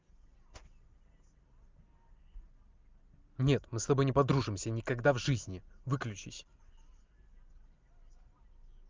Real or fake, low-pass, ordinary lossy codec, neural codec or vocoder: real; 7.2 kHz; Opus, 32 kbps; none